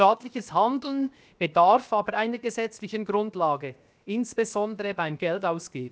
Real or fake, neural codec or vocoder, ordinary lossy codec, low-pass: fake; codec, 16 kHz, 0.7 kbps, FocalCodec; none; none